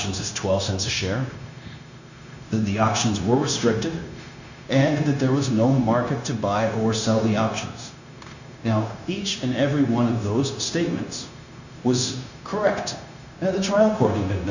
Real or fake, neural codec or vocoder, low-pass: fake; codec, 16 kHz, 0.9 kbps, LongCat-Audio-Codec; 7.2 kHz